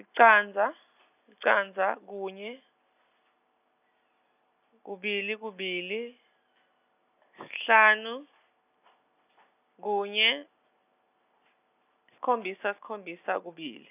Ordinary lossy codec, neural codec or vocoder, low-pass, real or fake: none; none; 3.6 kHz; real